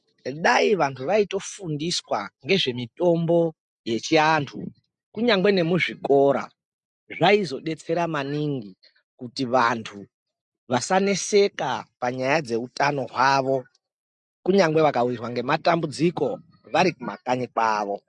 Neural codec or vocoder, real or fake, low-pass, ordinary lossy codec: none; real; 10.8 kHz; MP3, 64 kbps